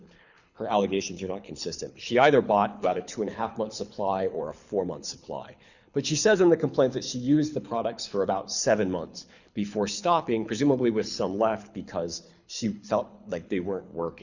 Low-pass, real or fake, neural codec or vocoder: 7.2 kHz; fake; codec, 24 kHz, 6 kbps, HILCodec